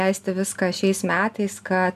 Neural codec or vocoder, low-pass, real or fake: none; 14.4 kHz; real